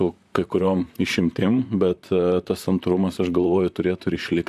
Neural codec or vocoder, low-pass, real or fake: vocoder, 44.1 kHz, 128 mel bands, Pupu-Vocoder; 14.4 kHz; fake